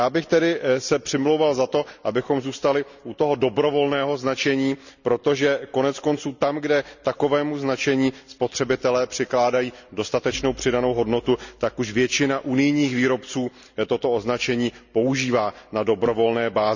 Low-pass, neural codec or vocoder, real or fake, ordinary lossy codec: 7.2 kHz; none; real; none